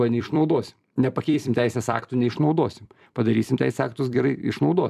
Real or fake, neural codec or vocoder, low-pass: fake; vocoder, 44.1 kHz, 128 mel bands every 256 samples, BigVGAN v2; 14.4 kHz